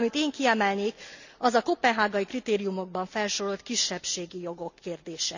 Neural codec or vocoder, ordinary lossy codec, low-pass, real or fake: none; none; 7.2 kHz; real